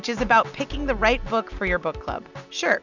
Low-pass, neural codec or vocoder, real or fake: 7.2 kHz; none; real